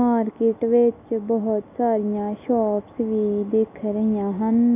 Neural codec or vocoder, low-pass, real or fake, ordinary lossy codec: none; 3.6 kHz; real; none